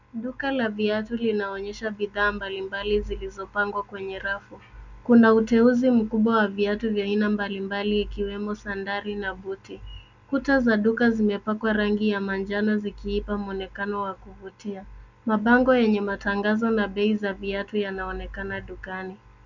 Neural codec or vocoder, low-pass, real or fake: none; 7.2 kHz; real